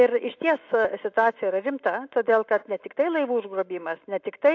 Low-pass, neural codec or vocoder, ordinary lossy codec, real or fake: 7.2 kHz; none; AAC, 48 kbps; real